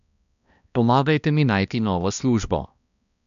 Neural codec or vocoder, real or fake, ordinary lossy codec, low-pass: codec, 16 kHz, 1 kbps, X-Codec, HuBERT features, trained on balanced general audio; fake; none; 7.2 kHz